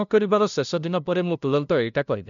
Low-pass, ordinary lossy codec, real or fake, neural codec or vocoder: 7.2 kHz; none; fake; codec, 16 kHz, 0.5 kbps, FunCodec, trained on LibriTTS, 25 frames a second